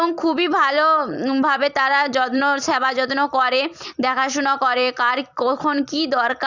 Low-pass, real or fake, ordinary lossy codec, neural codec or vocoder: 7.2 kHz; fake; Opus, 64 kbps; vocoder, 44.1 kHz, 128 mel bands every 256 samples, BigVGAN v2